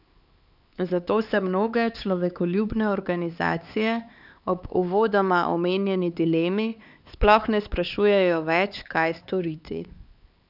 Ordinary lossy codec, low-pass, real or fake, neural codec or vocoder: none; 5.4 kHz; fake; codec, 16 kHz, 4 kbps, X-Codec, HuBERT features, trained on LibriSpeech